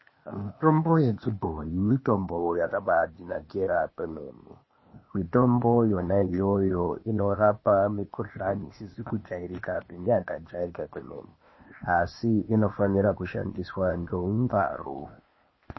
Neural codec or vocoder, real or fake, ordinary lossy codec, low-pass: codec, 16 kHz, 0.8 kbps, ZipCodec; fake; MP3, 24 kbps; 7.2 kHz